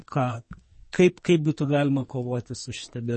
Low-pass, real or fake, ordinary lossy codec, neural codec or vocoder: 10.8 kHz; fake; MP3, 32 kbps; codec, 44.1 kHz, 2.6 kbps, SNAC